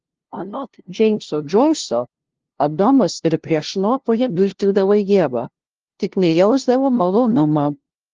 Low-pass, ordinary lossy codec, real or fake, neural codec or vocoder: 7.2 kHz; Opus, 16 kbps; fake; codec, 16 kHz, 0.5 kbps, FunCodec, trained on LibriTTS, 25 frames a second